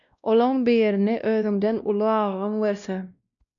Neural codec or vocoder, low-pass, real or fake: codec, 16 kHz, 1 kbps, X-Codec, WavLM features, trained on Multilingual LibriSpeech; 7.2 kHz; fake